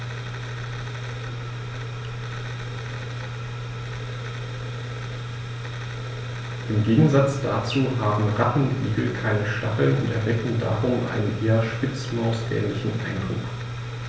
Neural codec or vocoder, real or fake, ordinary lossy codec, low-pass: none; real; none; none